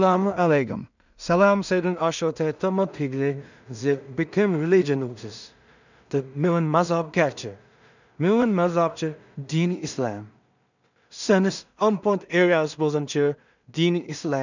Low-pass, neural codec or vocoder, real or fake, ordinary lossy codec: 7.2 kHz; codec, 16 kHz in and 24 kHz out, 0.4 kbps, LongCat-Audio-Codec, two codebook decoder; fake; none